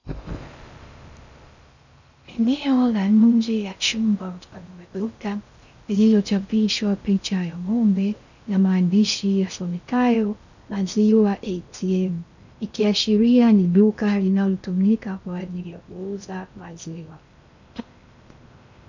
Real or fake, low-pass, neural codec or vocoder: fake; 7.2 kHz; codec, 16 kHz in and 24 kHz out, 0.6 kbps, FocalCodec, streaming, 4096 codes